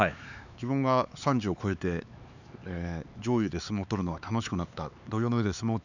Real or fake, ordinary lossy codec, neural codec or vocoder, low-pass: fake; none; codec, 16 kHz, 4 kbps, X-Codec, HuBERT features, trained on LibriSpeech; 7.2 kHz